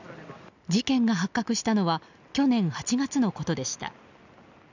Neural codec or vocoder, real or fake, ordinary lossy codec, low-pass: none; real; none; 7.2 kHz